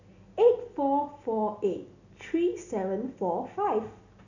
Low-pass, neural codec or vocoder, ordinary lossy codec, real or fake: 7.2 kHz; none; none; real